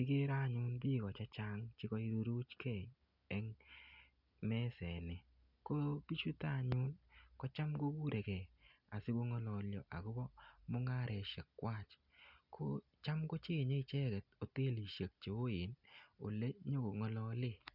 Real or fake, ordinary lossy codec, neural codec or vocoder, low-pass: real; none; none; 5.4 kHz